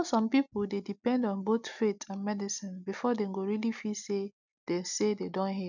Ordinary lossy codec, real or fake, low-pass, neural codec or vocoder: none; real; 7.2 kHz; none